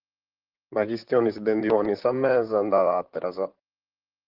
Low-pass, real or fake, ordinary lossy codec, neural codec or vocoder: 5.4 kHz; fake; Opus, 16 kbps; vocoder, 44.1 kHz, 128 mel bands, Pupu-Vocoder